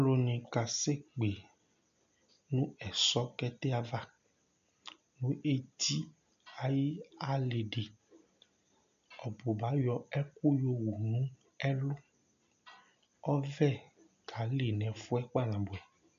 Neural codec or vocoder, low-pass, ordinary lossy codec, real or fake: none; 7.2 kHz; MP3, 64 kbps; real